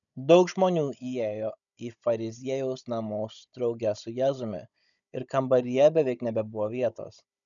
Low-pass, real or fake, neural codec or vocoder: 7.2 kHz; fake; codec, 16 kHz, 16 kbps, FunCodec, trained on Chinese and English, 50 frames a second